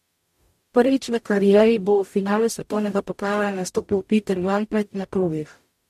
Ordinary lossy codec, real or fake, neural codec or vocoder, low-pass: MP3, 64 kbps; fake; codec, 44.1 kHz, 0.9 kbps, DAC; 14.4 kHz